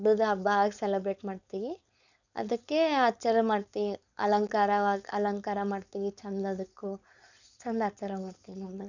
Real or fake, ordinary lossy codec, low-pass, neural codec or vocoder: fake; none; 7.2 kHz; codec, 16 kHz, 4.8 kbps, FACodec